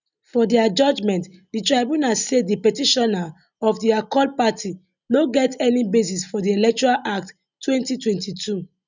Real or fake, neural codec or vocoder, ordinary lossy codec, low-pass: real; none; none; 7.2 kHz